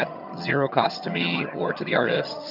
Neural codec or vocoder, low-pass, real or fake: vocoder, 22.05 kHz, 80 mel bands, HiFi-GAN; 5.4 kHz; fake